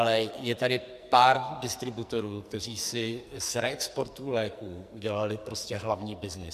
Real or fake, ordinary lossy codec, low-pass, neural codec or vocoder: fake; MP3, 96 kbps; 14.4 kHz; codec, 44.1 kHz, 2.6 kbps, SNAC